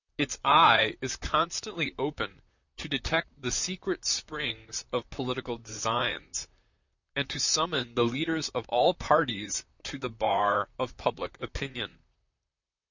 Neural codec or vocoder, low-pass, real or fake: vocoder, 44.1 kHz, 128 mel bands, Pupu-Vocoder; 7.2 kHz; fake